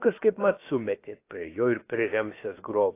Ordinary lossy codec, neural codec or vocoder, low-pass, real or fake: AAC, 24 kbps; codec, 16 kHz, about 1 kbps, DyCAST, with the encoder's durations; 3.6 kHz; fake